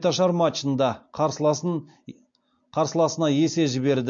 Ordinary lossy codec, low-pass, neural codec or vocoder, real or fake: MP3, 48 kbps; 7.2 kHz; none; real